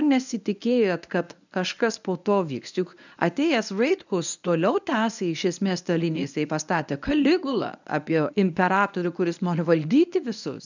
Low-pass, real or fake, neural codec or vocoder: 7.2 kHz; fake; codec, 24 kHz, 0.9 kbps, WavTokenizer, medium speech release version 1